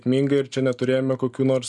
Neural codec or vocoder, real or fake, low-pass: none; real; 10.8 kHz